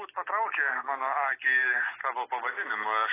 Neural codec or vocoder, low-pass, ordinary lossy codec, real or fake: none; 3.6 kHz; AAC, 16 kbps; real